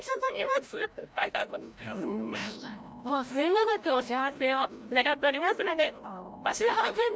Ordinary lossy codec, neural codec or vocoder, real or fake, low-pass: none; codec, 16 kHz, 0.5 kbps, FreqCodec, larger model; fake; none